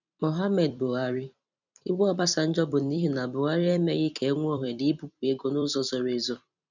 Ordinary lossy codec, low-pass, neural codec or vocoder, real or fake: none; 7.2 kHz; vocoder, 44.1 kHz, 128 mel bands every 256 samples, BigVGAN v2; fake